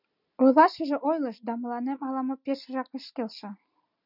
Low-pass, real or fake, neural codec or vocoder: 5.4 kHz; real; none